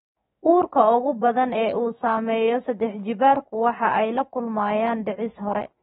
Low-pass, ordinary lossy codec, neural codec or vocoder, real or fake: 19.8 kHz; AAC, 16 kbps; autoencoder, 48 kHz, 128 numbers a frame, DAC-VAE, trained on Japanese speech; fake